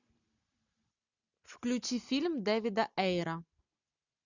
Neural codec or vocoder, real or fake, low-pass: none; real; 7.2 kHz